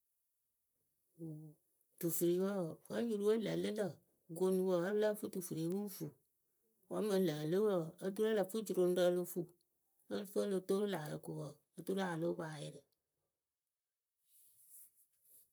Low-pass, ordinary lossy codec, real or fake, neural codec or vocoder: none; none; fake; vocoder, 44.1 kHz, 128 mel bands, Pupu-Vocoder